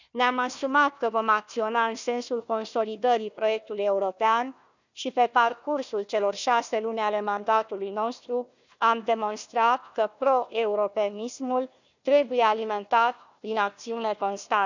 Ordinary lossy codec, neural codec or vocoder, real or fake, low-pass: none; codec, 16 kHz, 1 kbps, FunCodec, trained on Chinese and English, 50 frames a second; fake; 7.2 kHz